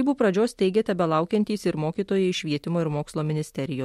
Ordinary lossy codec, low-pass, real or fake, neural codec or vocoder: MP3, 64 kbps; 19.8 kHz; real; none